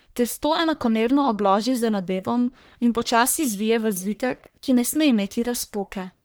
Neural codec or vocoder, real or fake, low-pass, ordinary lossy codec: codec, 44.1 kHz, 1.7 kbps, Pupu-Codec; fake; none; none